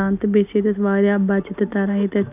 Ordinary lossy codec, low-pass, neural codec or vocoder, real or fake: none; 3.6 kHz; none; real